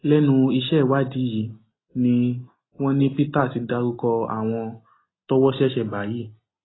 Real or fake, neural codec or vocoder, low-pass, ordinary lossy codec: real; none; 7.2 kHz; AAC, 16 kbps